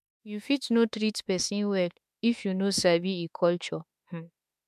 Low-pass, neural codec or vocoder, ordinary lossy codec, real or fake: 14.4 kHz; autoencoder, 48 kHz, 32 numbers a frame, DAC-VAE, trained on Japanese speech; MP3, 96 kbps; fake